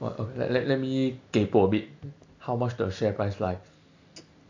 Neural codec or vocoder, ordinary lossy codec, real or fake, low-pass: none; AAC, 48 kbps; real; 7.2 kHz